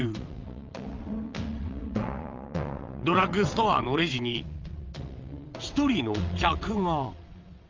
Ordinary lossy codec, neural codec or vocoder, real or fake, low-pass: Opus, 32 kbps; vocoder, 22.05 kHz, 80 mel bands, WaveNeXt; fake; 7.2 kHz